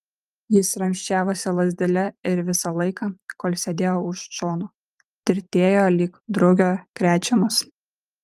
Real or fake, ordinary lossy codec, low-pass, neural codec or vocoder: fake; Opus, 32 kbps; 14.4 kHz; vocoder, 44.1 kHz, 128 mel bands every 512 samples, BigVGAN v2